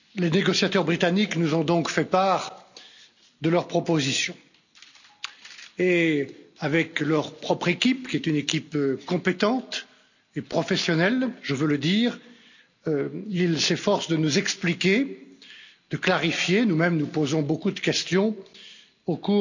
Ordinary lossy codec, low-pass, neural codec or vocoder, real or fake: none; 7.2 kHz; none; real